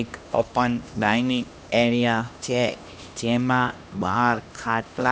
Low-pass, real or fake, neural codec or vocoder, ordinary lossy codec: none; fake; codec, 16 kHz, 1 kbps, X-Codec, HuBERT features, trained on LibriSpeech; none